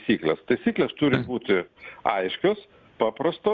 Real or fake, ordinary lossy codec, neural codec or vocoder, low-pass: real; Opus, 64 kbps; none; 7.2 kHz